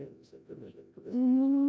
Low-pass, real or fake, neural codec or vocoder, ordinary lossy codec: none; fake; codec, 16 kHz, 0.5 kbps, FreqCodec, larger model; none